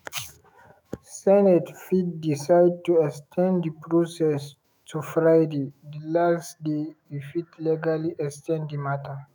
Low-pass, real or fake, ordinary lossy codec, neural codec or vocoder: none; fake; none; autoencoder, 48 kHz, 128 numbers a frame, DAC-VAE, trained on Japanese speech